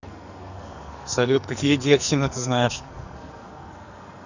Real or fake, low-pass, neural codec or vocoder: fake; 7.2 kHz; codec, 44.1 kHz, 2.6 kbps, SNAC